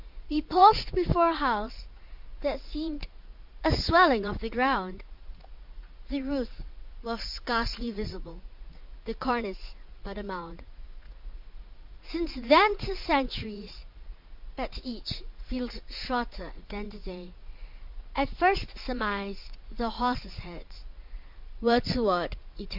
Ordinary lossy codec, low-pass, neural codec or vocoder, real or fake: MP3, 48 kbps; 5.4 kHz; vocoder, 44.1 kHz, 80 mel bands, Vocos; fake